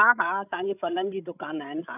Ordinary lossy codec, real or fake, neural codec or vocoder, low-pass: none; fake; codec, 16 kHz, 16 kbps, FreqCodec, larger model; 3.6 kHz